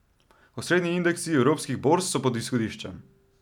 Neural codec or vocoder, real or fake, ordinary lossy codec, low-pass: none; real; none; 19.8 kHz